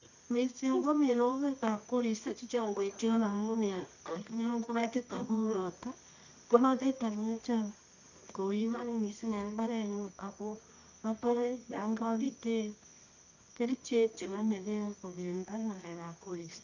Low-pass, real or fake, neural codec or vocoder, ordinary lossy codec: 7.2 kHz; fake; codec, 24 kHz, 0.9 kbps, WavTokenizer, medium music audio release; none